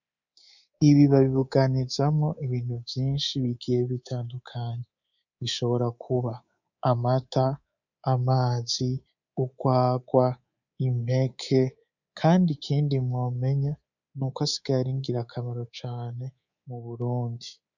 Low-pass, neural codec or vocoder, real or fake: 7.2 kHz; codec, 24 kHz, 3.1 kbps, DualCodec; fake